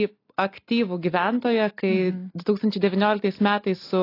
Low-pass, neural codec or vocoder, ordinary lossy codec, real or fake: 5.4 kHz; none; AAC, 32 kbps; real